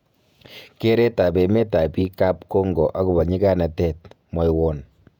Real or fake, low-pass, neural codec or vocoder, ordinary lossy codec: fake; 19.8 kHz; vocoder, 48 kHz, 128 mel bands, Vocos; none